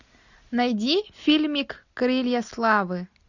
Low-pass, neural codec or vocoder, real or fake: 7.2 kHz; none; real